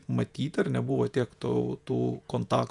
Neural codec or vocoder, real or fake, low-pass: vocoder, 48 kHz, 128 mel bands, Vocos; fake; 10.8 kHz